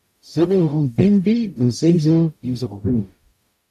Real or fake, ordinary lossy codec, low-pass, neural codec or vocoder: fake; MP3, 96 kbps; 14.4 kHz; codec, 44.1 kHz, 0.9 kbps, DAC